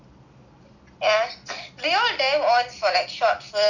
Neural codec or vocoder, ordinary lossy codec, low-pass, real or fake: none; none; 7.2 kHz; real